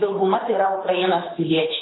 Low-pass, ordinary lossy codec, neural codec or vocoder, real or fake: 7.2 kHz; AAC, 16 kbps; codec, 24 kHz, 6 kbps, HILCodec; fake